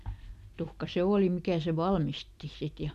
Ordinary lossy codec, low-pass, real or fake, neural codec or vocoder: none; 14.4 kHz; real; none